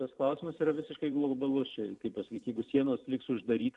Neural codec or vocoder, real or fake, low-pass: vocoder, 44.1 kHz, 128 mel bands every 512 samples, BigVGAN v2; fake; 10.8 kHz